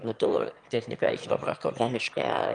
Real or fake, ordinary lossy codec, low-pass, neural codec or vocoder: fake; Opus, 32 kbps; 9.9 kHz; autoencoder, 22.05 kHz, a latent of 192 numbers a frame, VITS, trained on one speaker